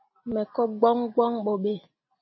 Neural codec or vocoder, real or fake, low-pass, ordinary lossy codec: none; real; 7.2 kHz; MP3, 24 kbps